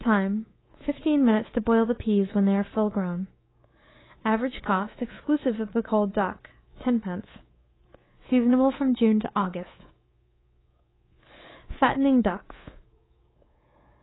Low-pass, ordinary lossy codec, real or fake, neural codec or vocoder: 7.2 kHz; AAC, 16 kbps; fake; codec, 24 kHz, 3.1 kbps, DualCodec